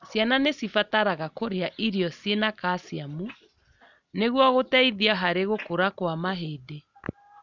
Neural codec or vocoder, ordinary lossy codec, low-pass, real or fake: none; none; 7.2 kHz; real